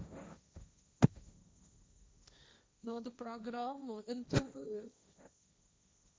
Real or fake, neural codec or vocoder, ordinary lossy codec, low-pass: fake; codec, 16 kHz, 1.1 kbps, Voila-Tokenizer; none; none